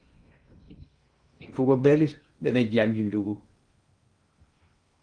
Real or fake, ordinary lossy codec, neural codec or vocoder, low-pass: fake; Opus, 32 kbps; codec, 16 kHz in and 24 kHz out, 0.6 kbps, FocalCodec, streaming, 2048 codes; 9.9 kHz